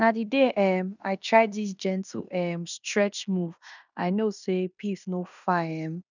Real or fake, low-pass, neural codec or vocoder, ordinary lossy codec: fake; 7.2 kHz; codec, 16 kHz in and 24 kHz out, 0.9 kbps, LongCat-Audio-Codec, fine tuned four codebook decoder; none